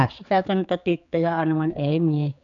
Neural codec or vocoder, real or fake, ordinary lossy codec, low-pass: codec, 16 kHz, 4 kbps, X-Codec, HuBERT features, trained on general audio; fake; none; 7.2 kHz